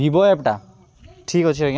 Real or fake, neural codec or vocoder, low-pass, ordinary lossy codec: real; none; none; none